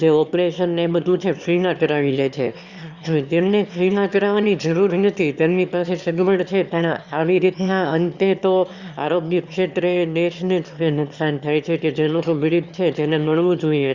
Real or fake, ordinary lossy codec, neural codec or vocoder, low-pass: fake; Opus, 64 kbps; autoencoder, 22.05 kHz, a latent of 192 numbers a frame, VITS, trained on one speaker; 7.2 kHz